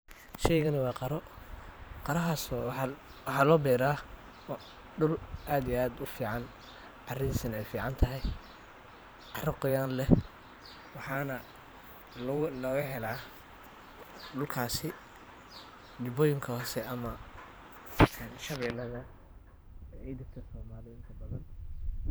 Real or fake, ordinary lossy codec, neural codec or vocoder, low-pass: fake; none; vocoder, 44.1 kHz, 128 mel bands every 512 samples, BigVGAN v2; none